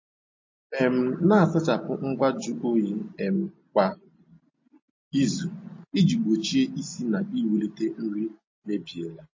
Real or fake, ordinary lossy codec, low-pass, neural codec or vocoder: real; MP3, 32 kbps; 7.2 kHz; none